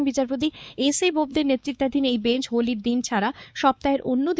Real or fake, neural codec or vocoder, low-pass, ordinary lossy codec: fake; codec, 16 kHz, 4 kbps, X-Codec, WavLM features, trained on Multilingual LibriSpeech; none; none